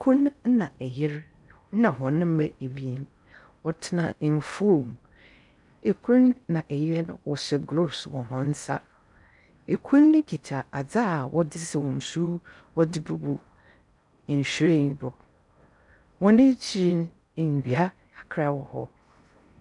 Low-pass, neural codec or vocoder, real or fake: 10.8 kHz; codec, 16 kHz in and 24 kHz out, 0.6 kbps, FocalCodec, streaming, 4096 codes; fake